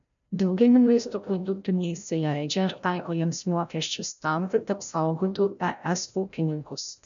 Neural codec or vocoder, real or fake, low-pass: codec, 16 kHz, 0.5 kbps, FreqCodec, larger model; fake; 7.2 kHz